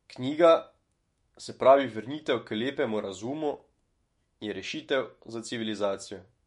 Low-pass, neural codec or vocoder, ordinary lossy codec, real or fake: 19.8 kHz; autoencoder, 48 kHz, 128 numbers a frame, DAC-VAE, trained on Japanese speech; MP3, 48 kbps; fake